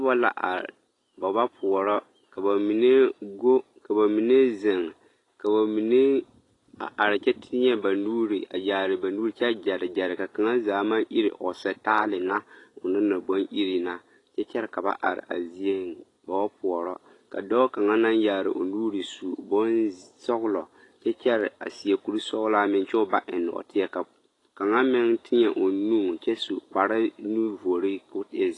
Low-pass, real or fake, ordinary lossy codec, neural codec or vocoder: 10.8 kHz; real; AAC, 32 kbps; none